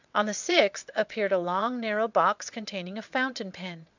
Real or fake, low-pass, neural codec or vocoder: fake; 7.2 kHz; vocoder, 22.05 kHz, 80 mel bands, WaveNeXt